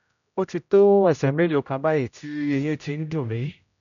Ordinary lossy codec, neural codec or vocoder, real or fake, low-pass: none; codec, 16 kHz, 0.5 kbps, X-Codec, HuBERT features, trained on general audio; fake; 7.2 kHz